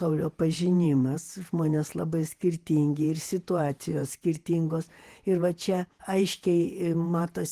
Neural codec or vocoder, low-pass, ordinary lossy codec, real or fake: vocoder, 48 kHz, 128 mel bands, Vocos; 14.4 kHz; Opus, 24 kbps; fake